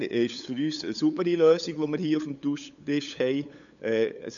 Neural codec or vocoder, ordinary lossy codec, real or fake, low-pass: codec, 16 kHz, 8 kbps, FunCodec, trained on LibriTTS, 25 frames a second; none; fake; 7.2 kHz